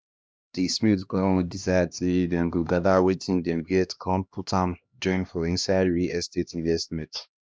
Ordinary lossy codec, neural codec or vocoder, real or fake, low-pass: none; codec, 16 kHz, 1 kbps, X-Codec, HuBERT features, trained on LibriSpeech; fake; none